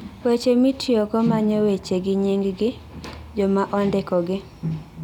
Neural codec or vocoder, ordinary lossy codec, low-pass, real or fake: none; none; 19.8 kHz; real